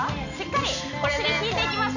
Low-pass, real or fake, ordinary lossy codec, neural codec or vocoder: 7.2 kHz; real; none; none